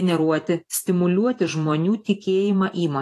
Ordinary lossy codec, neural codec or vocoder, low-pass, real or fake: AAC, 48 kbps; vocoder, 44.1 kHz, 128 mel bands every 512 samples, BigVGAN v2; 14.4 kHz; fake